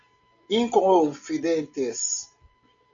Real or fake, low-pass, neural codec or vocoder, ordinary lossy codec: real; 7.2 kHz; none; MP3, 64 kbps